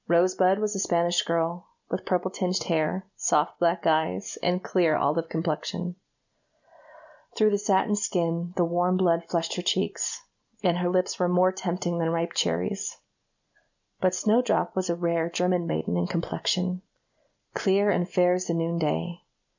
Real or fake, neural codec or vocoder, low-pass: real; none; 7.2 kHz